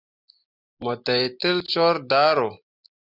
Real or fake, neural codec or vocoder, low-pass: real; none; 5.4 kHz